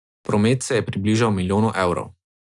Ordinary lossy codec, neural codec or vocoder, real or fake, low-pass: none; none; real; 10.8 kHz